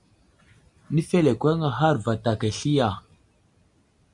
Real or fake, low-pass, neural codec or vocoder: real; 10.8 kHz; none